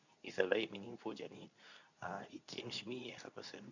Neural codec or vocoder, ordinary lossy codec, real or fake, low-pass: codec, 24 kHz, 0.9 kbps, WavTokenizer, medium speech release version 2; none; fake; 7.2 kHz